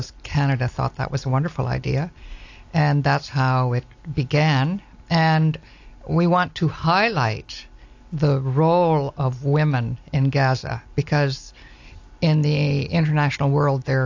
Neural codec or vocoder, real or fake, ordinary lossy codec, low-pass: none; real; AAC, 48 kbps; 7.2 kHz